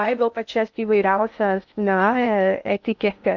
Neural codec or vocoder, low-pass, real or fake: codec, 16 kHz in and 24 kHz out, 0.6 kbps, FocalCodec, streaming, 2048 codes; 7.2 kHz; fake